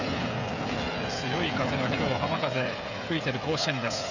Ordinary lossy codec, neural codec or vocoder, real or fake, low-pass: none; codec, 16 kHz, 16 kbps, FreqCodec, smaller model; fake; 7.2 kHz